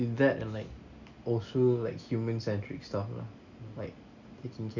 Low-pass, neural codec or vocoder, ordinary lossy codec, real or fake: 7.2 kHz; none; none; real